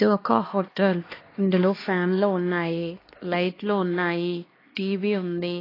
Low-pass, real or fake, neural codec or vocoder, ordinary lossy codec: 5.4 kHz; fake; codec, 16 kHz, 2 kbps, X-Codec, HuBERT features, trained on LibriSpeech; AAC, 24 kbps